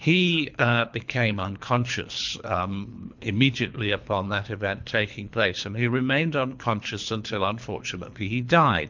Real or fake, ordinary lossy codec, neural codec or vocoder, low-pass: fake; MP3, 64 kbps; codec, 24 kHz, 3 kbps, HILCodec; 7.2 kHz